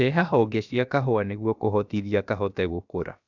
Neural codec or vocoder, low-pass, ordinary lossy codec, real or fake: codec, 16 kHz, about 1 kbps, DyCAST, with the encoder's durations; 7.2 kHz; none; fake